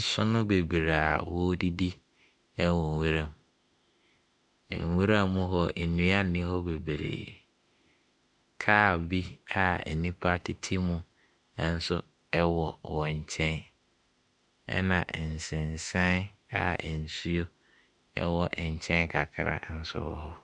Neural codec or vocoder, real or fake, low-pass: autoencoder, 48 kHz, 32 numbers a frame, DAC-VAE, trained on Japanese speech; fake; 10.8 kHz